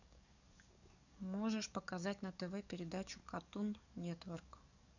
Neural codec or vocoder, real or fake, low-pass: codec, 44.1 kHz, 7.8 kbps, DAC; fake; 7.2 kHz